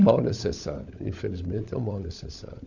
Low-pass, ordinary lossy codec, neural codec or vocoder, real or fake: 7.2 kHz; none; codec, 16 kHz, 16 kbps, FunCodec, trained on LibriTTS, 50 frames a second; fake